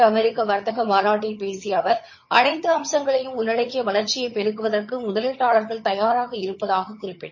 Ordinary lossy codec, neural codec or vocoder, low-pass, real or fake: MP3, 32 kbps; vocoder, 22.05 kHz, 80 mel bands, HiFi-GAN; 7.2 kHz; fake